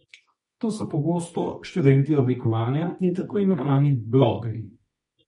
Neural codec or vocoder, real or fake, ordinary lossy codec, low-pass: codec, 24 kHz, 0.9 kbps, WavTokenizer, medium music audio release; fake; MP3, 48 kbps; 10.8 kHz